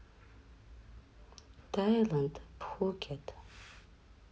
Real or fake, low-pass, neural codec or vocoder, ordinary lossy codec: real; none; none; none